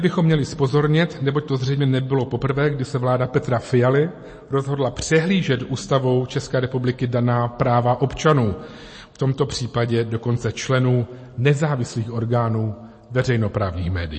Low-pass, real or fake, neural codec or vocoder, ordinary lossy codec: 9.9 kHz; real; none; MP3, 32 kbps